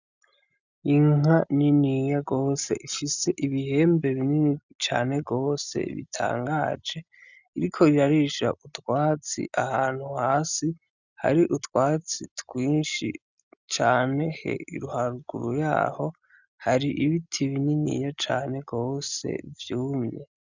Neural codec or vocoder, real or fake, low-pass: none; real; 7.2 kHz